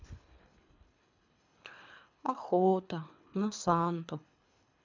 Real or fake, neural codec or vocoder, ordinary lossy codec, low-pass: fake; codec, 24 kHz, 3 kbps, HILCodec; MP3, 64 kbps; 7.2 kHz